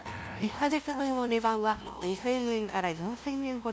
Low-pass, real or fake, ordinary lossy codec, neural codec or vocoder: none; fake; none; codec, 16 kHz, 0.5 kbps, FunCodec, trained on LibriTTS, 25 frames a second